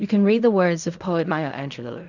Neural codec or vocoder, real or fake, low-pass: codec, 16 kHz in and 24 kHz out, 0.4 kbps, LongCat-Audio-Codec, fine tuned four codebook decoder; fake; 7.2 kHz